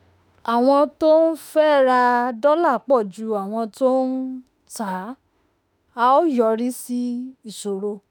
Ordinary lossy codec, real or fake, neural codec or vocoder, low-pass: none; fake; autoencoder, 48 kHz, 32 numbers a frame, DAC-VAE, trained on Japanese speech; none